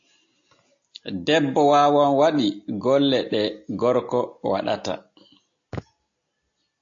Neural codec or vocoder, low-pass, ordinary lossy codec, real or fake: none; 7.2 kHz; AAC, 48 kbps; real